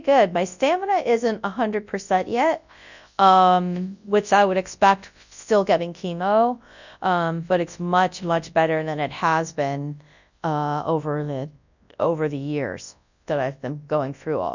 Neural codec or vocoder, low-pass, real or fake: codec, 24 kHz, 0.9 kbps, WavTokenizer, large speech release; 7.2 kHz; fake